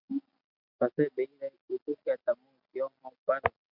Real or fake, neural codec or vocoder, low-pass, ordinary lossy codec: real; none; 5.4 kHz; MP3, 48 kbps